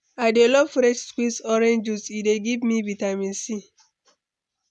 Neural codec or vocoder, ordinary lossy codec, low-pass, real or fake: none; none; none; real